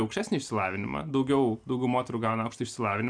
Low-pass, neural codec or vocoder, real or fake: 9.9 kHz; none; real